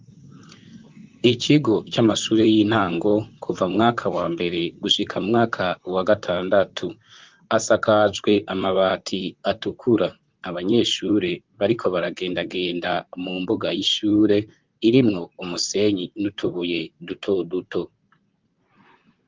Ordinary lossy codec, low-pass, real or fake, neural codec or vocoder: Opus, 32 kbps; 7.2 kHz; fake; vocoder, 44.1 kHz, 128 mel bands, Pupu-Vocoder